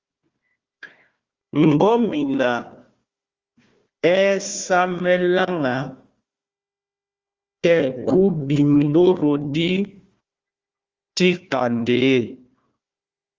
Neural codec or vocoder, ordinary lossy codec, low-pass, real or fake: codec, 16 kHz, 1 kbps, FunCodec, trained on Chinese and English, 50 frames a second; Opus, 32 kbps; 7.2 kHz; fake